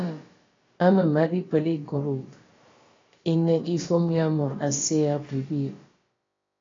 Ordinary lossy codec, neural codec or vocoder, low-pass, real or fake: AAC, 32 kbps; codec, 16 kHz, about 1 kbps, DyCAST, with the encoder's durations; 7.2 kHz; fake